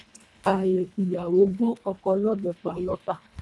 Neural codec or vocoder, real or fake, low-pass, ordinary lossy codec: codec, 24 kHz, 1.5 kbps, HILCodec; fake; none; none